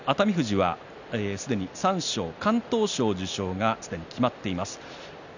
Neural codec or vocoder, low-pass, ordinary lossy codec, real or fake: none; 7.2 kHz; none; real